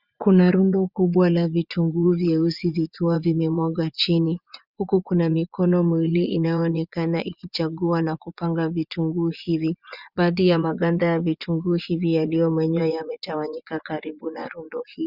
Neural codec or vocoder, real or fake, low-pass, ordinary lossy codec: vocoder, 24 kHz, 100 mel bands, Vocos; fake; 5.4 kHz; Opus, 64 kbps